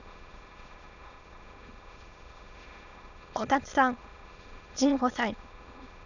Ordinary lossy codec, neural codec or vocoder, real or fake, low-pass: none; autoencoder, 22.05 kHz, a latent of 192 numbers a frame, VITS, trained on many speakers; fake; 7.2 kHz